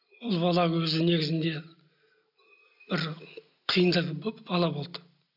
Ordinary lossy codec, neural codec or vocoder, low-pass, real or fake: none; none; 5.4 kHz; real